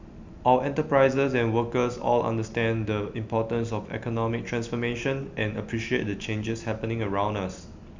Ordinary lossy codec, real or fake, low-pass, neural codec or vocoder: MP3, 64 kbps; real; 7.2 kHz; none